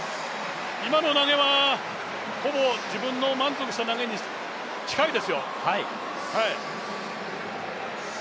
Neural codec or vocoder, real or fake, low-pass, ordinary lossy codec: none; real; none; none